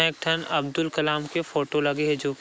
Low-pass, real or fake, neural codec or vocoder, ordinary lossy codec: none; real; none; none